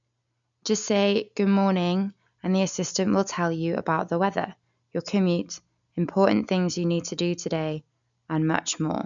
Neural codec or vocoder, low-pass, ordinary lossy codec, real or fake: none; 7.2 kHz; none; real